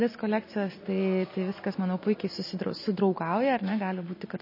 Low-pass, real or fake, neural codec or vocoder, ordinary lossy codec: 5.4 kHz; real; none; MP3, 24 kbps